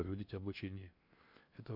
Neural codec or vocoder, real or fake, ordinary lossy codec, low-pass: codec, 16 kHz in and 24 kHz out, 0.6 kbps, FocalCodec, streaming, 2048 codes; fake; none; 5.4 kHz